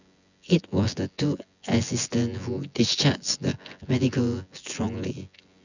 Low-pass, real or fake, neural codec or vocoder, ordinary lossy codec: 7.2 kHz; fake; vocoder, 24 kHz, 100 mel bands, Vocos; none